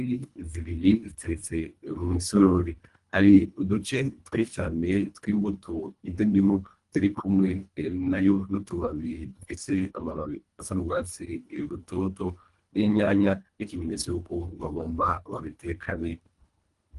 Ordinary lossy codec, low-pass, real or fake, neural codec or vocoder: Opus, 32 kbps; 10.8 kHz; fake; codec, 24 kHz, 1.5 kbps, HILCodec